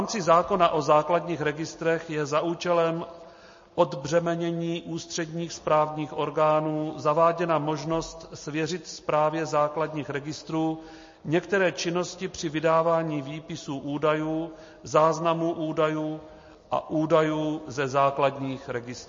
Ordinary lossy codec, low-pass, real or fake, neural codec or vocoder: MP3, 32 kbps; 7.2 kHz; real; none